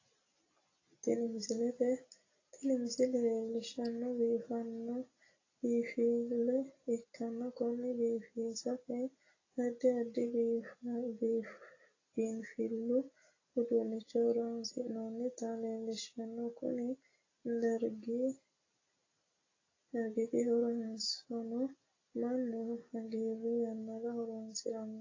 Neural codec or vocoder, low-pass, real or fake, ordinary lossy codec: none; 7.2 kHz; real; AAC, 32 kbps